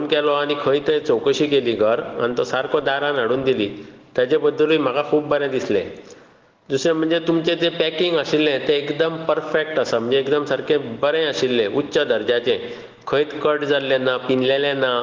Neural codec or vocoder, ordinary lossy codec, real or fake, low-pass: none; Opus, 16 kbps; real; 7.2 kHz